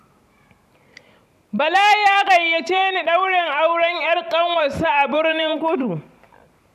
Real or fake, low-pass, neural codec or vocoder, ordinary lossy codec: fake; 14.4 kHz; vocoder, 44.1 kHz, 128 mel bands every 512 samples, BigVGAN v2; none